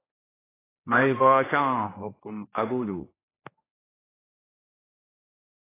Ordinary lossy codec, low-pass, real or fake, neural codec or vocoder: AAC, 16 kbps; 3.6 kHz; fake; codec, 16 kHz, 1 kbps, X-Codec, HuBERT features, trained on general audio